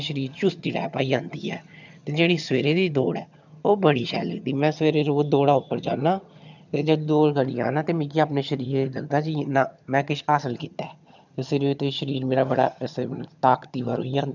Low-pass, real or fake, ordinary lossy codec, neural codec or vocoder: 7.2 kHz; fake; none; vocoder, 22.05 kHz, 80 mel bands, HiFi-GAN